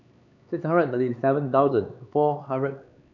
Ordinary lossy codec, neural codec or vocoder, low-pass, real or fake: none; codec, 16 kHz, 4 kbps, X-Codec, HuBERT features, trained on LibriSpeech; 7.2 kHz; fake